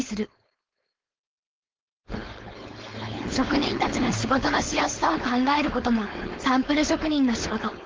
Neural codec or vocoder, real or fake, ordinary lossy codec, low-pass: codec, 16 kHz, 4.8 kbps, FACodec; fake; Opus, 16 kbps; 7.2 kHz